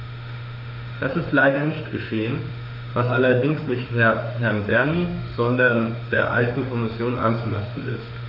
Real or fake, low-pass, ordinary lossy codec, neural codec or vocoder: fake; 5.4 kHz; none; autoencoder, 48 kHz, 32 numbers a frame, DAC-VAE, trained on Japanese speech